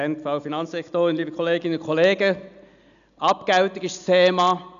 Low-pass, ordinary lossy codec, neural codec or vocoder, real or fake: 7.2 kHz; none; none; real